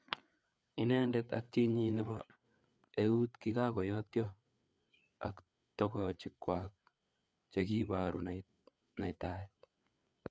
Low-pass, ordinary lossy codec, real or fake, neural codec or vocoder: none; none; fake; codec, 16 kHz, 4 kbps, FreqCodec, larger model